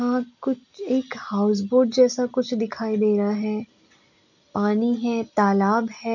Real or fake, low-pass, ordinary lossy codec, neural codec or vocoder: real; 7.2 kHz; none; none